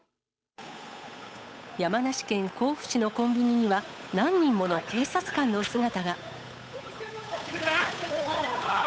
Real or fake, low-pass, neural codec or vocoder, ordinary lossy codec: fake; none; codec, 16 kHz, 8 kbps, FunCodec, trained on Chinese and English, 25 frames a second; none